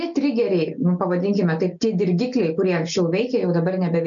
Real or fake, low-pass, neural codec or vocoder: real; 7.2 kHz; none